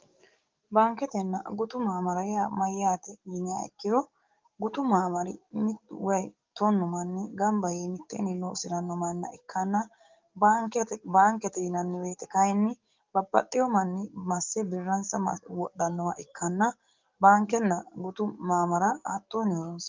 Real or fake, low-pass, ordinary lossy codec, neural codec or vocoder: fake; 7.2 kHz; Opus, 24 kbps; codec, 44.1 kHz, 7.8 kbps, DAC